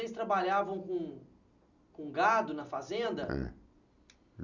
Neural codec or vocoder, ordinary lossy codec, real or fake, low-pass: none; none; real; 7.2 kHz